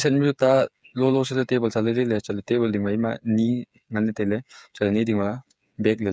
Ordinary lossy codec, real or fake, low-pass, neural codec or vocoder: none; fake; none; codec, 16 kHz, 8 kbps, FreqCodec, smaller model